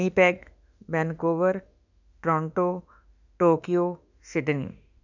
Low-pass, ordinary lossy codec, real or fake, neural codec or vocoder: 7.2 kHz; none; fake; autoencoder, 48 kHz, 32 numbers a frame, DAC-VAE, trained on Japanese speech